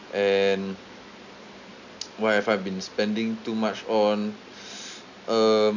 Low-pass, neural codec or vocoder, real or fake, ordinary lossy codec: 7.2 kHz; none; real; none